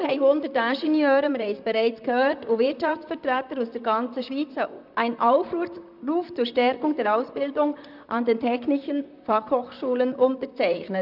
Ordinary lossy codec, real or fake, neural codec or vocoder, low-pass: none; fake; vocoder, 44.1 kHz, 128 mel bands, Pupu-Vocoder; 5.4 kHz